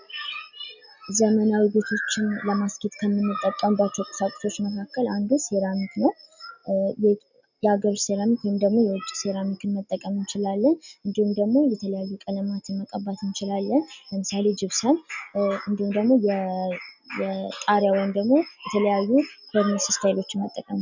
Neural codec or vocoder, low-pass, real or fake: none; 7.2 kHz; real